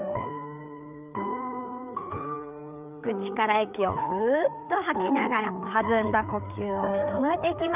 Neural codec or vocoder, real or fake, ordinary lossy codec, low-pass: codec, 16 kHz, 4 kbps, FreqCodec, larger model; fake; none; 3.6 kHz